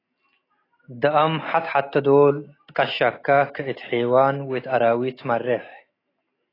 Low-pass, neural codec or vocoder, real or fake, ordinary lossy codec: 5.4 kHz; none; real; AAC, 24 kbps